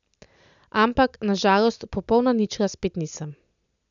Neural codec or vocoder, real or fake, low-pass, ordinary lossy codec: none; real; 7.2 kHz; none